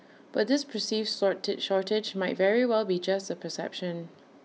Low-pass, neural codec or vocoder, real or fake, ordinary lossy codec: none; none; real; none